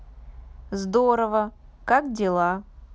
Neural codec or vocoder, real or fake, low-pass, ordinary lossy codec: none; real; none; none